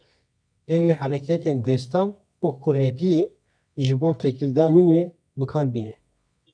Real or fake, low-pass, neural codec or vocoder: fake; 9.9 kHz; codec, 24 kHz, 0.9 kbps, WavTokenizer, medium music audio release